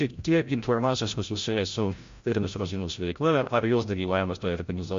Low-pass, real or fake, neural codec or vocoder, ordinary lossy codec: 7.2 kHz; fake; codec, 16 kHz, 0.5 kbps, FreqCodec, larger model; MP3, 48 kbps